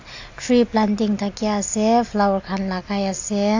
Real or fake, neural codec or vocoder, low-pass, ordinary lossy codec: real; none; 7.2 kHz; AAC, 48 kbps